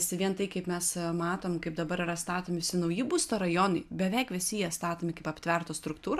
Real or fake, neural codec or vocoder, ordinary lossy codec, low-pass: real; none; AAC, 96 kbps; 14.4 kHz